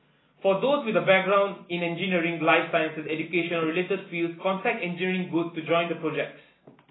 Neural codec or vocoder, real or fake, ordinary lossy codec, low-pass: none; real; AAC, 16 kbps; 7.2 kHz